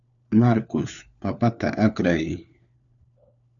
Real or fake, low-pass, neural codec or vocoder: fake; 7.2 kHz; codec, 16 kHz, 4 kbps, FunCodec, trained on LibriTTS, 50 frames a second